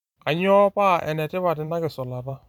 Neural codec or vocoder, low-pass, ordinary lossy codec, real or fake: vocoder, 44.1 kHz, 128 mel bands every 512 samples, BigVGAN v2; 19.8 kHz; none; fake